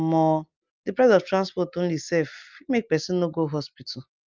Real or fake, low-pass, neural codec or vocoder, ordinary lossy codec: real; 7.2 kHz; none; Opus, 24 kbps